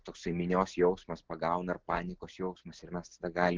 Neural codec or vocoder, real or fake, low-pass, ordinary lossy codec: none; real; 7.2 kHz; Opus, 16 kbps